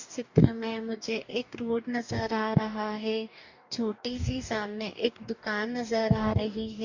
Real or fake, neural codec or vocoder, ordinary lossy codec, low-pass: fake; codec, 44.1 kHz, 2.6 kbps, DAC; none; 7.2 kHz